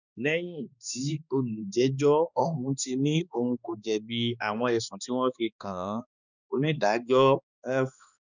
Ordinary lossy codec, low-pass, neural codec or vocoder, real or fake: none; 7.2 kHz; codec, 16 kHz, 2 kbps, X-Codec, HuBERT features, trained on balanced general audio; fake